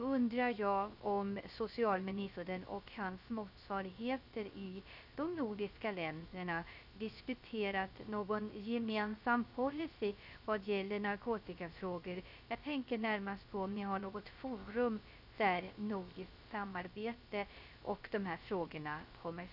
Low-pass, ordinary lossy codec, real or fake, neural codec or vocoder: 5.4 kHz; none; fake; codec, 16 kHz, 0.3 kbps, FocalCodec